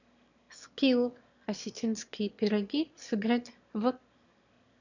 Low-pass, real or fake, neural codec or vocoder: 7.2 kHz; fake; autoencoder, 22.05 kHz, a latent of 192 numbers a frame, VITS, trained on one speaker